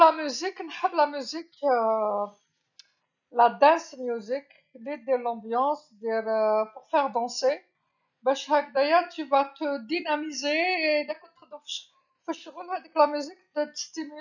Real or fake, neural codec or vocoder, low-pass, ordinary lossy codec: real; none; 7.2 kHz; none